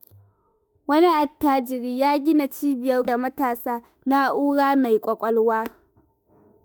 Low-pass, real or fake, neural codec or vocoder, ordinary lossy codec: none; fake; autoencoder, 48 kHz, 32 numbers a frame, DAC-VAE, trained on Japanese speech; none